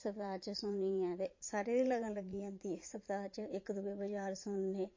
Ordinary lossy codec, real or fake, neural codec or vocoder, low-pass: MP3, 32 kbps; fake; vocoder, 44.1 kHz, 80 mel bands, Vocos; 7.2 kHz